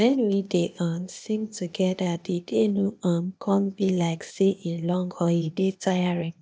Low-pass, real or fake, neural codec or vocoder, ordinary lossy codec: none; fake; codec, 16 kHz, 0.8 kbps, ZipCodec; none